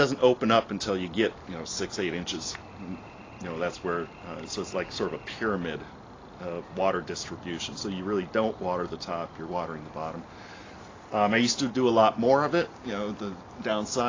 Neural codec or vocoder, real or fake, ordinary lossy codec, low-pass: none; real; AAC, 32 kbps; 7.2 kHz